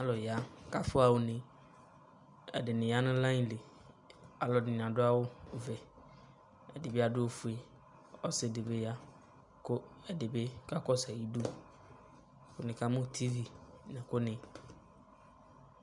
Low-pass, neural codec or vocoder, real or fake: 10.8 kHz; none; real